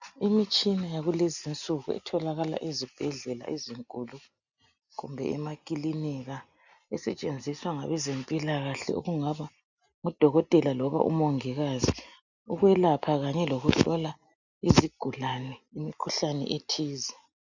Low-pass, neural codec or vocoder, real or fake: 7.2 kHz; none; real